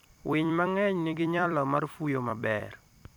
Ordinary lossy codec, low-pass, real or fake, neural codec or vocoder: none; 19.8 kHz; fake; vocoder, 44.1 kHz, 128 mel bands every 256 samples, BigVGAN v2